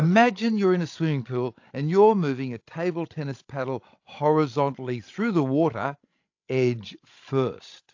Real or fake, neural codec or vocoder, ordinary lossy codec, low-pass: fake; codec, 16 kHz, 16 kbps, FreqCodec, larger model; AAC, 48 kbps; 7.2 kHz